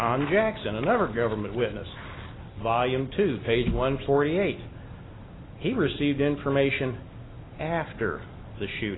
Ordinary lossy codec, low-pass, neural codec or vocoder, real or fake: AAC, 16 kbps; 7.2 kHz; none; real